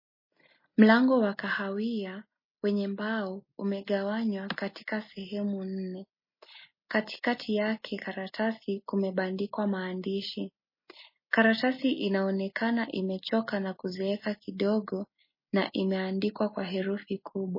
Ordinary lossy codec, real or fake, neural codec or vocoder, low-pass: MP3, 24 kbps; real; none; 5.4 kHz